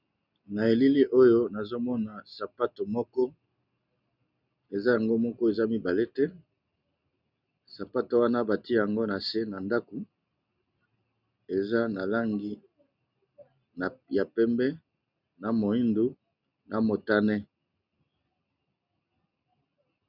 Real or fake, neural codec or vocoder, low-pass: real; none; 5.4 kHz